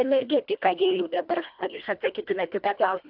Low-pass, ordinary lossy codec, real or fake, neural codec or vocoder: 5.4 kHz; AAC, 48 kbps; fake; codec, 24 kHz, 1.5 kbps, HILCodec